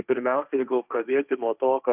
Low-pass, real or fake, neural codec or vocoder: 3.6 kHz; fake; codec, 16 kHz, 1.1 kbps, Voila-Tokenizer